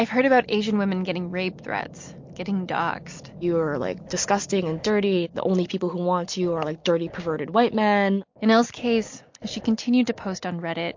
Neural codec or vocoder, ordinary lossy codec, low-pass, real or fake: none; MP3, 48 kbps; 7.2 kHz; real